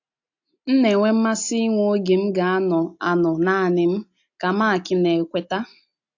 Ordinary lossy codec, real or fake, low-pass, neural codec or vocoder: AAC, 48 kbps; real; 7.2 kHz; none